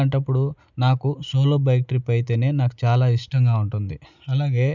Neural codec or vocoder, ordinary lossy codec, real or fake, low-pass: none; none; real; 7.2 kHz